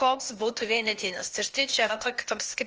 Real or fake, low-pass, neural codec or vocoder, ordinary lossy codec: fake; 7.2 kHz; codec, 16 kHz, 0.8 kbps, ZipCodec; Opus, 24 kbps